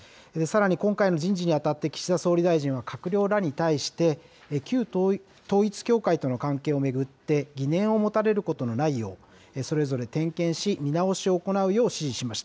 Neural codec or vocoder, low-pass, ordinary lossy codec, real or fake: none; none; none; real